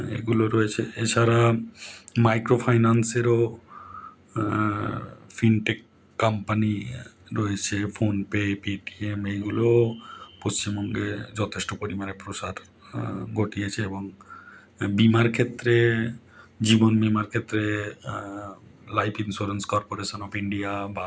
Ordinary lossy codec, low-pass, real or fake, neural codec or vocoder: none; none; real; none